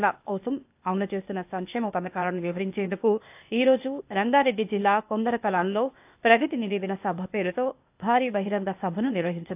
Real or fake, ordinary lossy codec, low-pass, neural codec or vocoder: fake; AAC, 32 kbps; 3.6 kHz; codec, 16 kHz, 0.8 kbps, ZipCodec